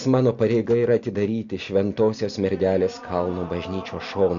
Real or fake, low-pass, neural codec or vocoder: real; 7.2 kHz; none